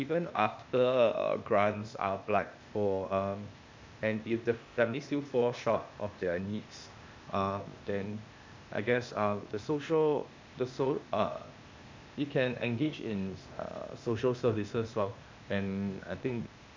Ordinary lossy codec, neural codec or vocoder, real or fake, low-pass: MP3, 64 kbps; codec, 16 kHz, 0.8 kbps, ZipCodec; fake; 7.2 kHz